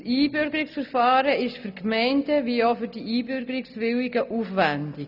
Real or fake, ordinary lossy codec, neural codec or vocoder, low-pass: real; none; none; 5.4 kHz